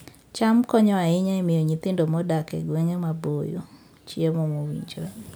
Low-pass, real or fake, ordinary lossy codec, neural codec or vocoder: none; real; none; none